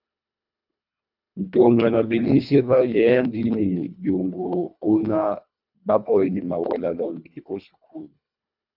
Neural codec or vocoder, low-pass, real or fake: codec, 24 kHz, 1.5 kbps, HILCodec; 5.4 kHz; fake